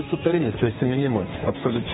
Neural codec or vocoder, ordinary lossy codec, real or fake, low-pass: codec, 32 kHz, 1.9 kbps, SNAC; AAC, 16 kbps; fake; 14.4 kHz